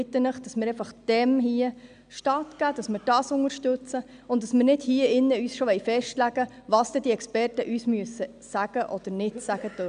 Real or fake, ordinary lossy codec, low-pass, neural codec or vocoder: real; none; 9.9 kHz; none